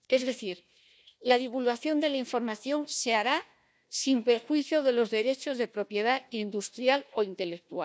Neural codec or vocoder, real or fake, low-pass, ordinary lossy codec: codec, 16 kHz, 1 kbps, FunCodec, trained on Chinese and English, 50 frames a second; fake; none; none